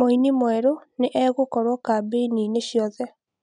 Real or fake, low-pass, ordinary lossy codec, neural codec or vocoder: real; 9.9 kHz; none; none